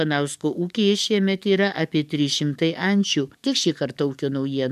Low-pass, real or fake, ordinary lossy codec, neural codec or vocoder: 14.4 kHz; fake; MP3, 96 kbps; codec, 44.1 kHz, 7.8 kbps, DAC